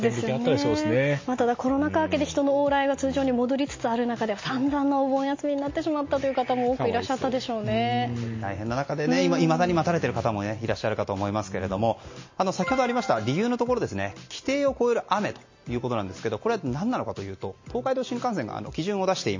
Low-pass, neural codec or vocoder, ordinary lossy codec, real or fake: 7.2 kHz; none; MP3, 32 kbps; real